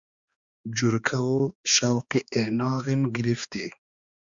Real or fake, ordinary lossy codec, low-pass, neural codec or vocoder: fake; Opus, 64 kbps; 7.2 kHz; codec, 16 kHz, 2 kbps, X-Codec, HuBERT features, trained on balanced general audio